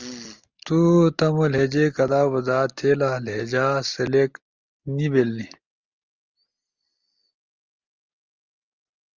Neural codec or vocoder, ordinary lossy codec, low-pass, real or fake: none; Opus, 32 kbps; 7.2 kHz; real